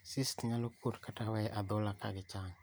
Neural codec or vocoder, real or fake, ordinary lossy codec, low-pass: none; real; none; none